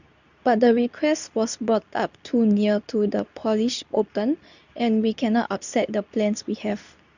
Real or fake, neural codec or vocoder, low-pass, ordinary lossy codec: fake; codec, 24 kHz, 0.9 kbps, WavTokenizer, medium speech release version 2; 7.2 kHz; none